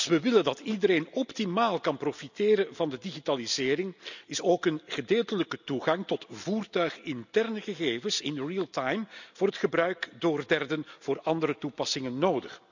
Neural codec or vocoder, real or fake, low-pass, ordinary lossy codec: none; real; 7.2 kHz; none